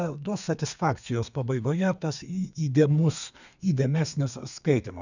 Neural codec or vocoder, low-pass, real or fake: codec, 32 kHz, 1.9 kbps, SNAC; 7.2 kHz; fake